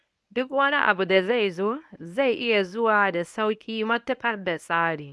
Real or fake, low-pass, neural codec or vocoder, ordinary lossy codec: fake; none; codec, 24 kHz, 0.9 kbps, WavTokenizer, medium speech release version 1; none